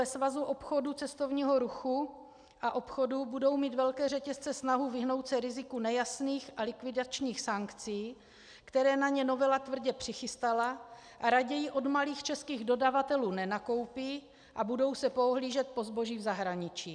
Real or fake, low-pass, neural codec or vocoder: real; 9.9 kHz; none